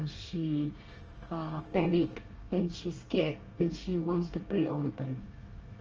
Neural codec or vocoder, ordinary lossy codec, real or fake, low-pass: codec, 24 kHz, 1 kbps, SNAC; Opus, 24 kbps; fake; 7.2 kHz